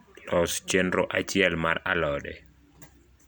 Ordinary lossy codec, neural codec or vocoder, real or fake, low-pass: none; none; real; none